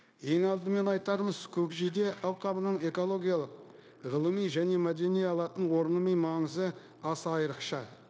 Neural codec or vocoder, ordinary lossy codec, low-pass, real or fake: codec, 16 kHz, 0.9 kbps, LongCat-Audio-Codec; none; none; fake